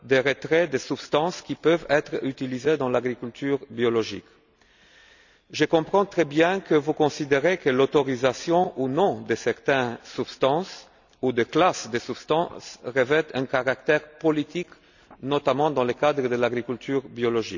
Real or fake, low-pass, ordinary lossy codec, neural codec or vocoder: real; 7.2 kHz; none; none